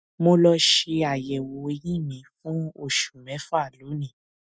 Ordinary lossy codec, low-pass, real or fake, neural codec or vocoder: none; none; real; none